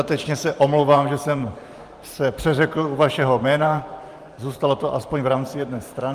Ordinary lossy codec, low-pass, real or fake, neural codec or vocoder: Opus, 32 kbps; 14.4 kHz; fake; vocoder, 44.1 kHz, 128 mel bands every 512 samples, BigVGAN v2